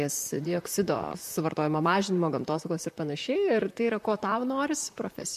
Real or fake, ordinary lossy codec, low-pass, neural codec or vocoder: fake; MP3, 64 kbps; 14.4 kHz; vocoder, 44.1 kHz, 128 mel bands, Pupu-Vocoder